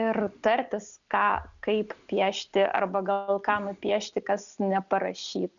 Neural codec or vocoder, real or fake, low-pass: none; real; 7.2 kHz